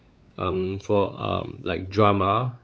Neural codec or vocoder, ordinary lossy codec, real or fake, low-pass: codec, 16 kHz, 4 kbps, X-Codec, WavLM features, trained on Multilingual LibriSpeech; none; fake; none